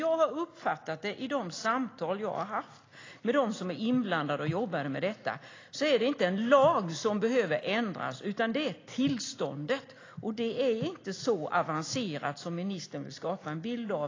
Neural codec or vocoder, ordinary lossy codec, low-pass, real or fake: none; AAC, 32 kbps; 7.2 kHz; real